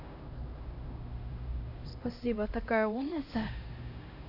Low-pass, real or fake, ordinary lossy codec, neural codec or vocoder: 5.4 kHz; fake; none; codec, 16 kHz, 1 kbps, X-Codec, HuBERT features, trained on LibriSpeech